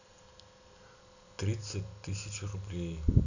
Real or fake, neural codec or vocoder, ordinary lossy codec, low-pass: real; none; none; 7.2 kHz